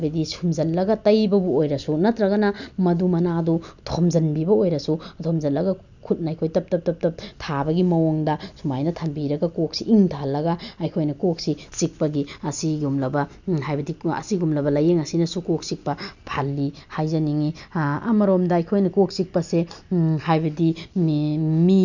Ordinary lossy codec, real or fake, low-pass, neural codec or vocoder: none; real; 7.2 kHz; none